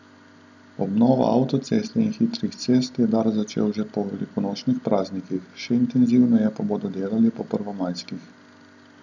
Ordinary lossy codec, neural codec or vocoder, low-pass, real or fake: none; none; 7.2 kHz; real